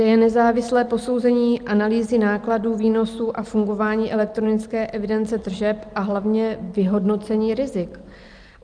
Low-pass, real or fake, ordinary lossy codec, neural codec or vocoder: 9.9 kHz; real; Opus, 32 kbps; none